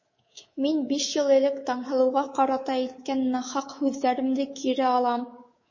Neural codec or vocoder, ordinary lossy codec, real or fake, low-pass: codec, 24 kHz, 3.1 kbps, DualCodec; MP3, 32 kbps; fake; 7.2 kHz